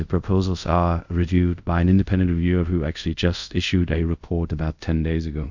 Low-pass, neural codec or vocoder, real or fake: 7.2 kHz; codec, 24 kHz, 0.5 kbps, DualCodec; fake